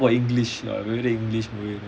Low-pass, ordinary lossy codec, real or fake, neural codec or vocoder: none; none; real; none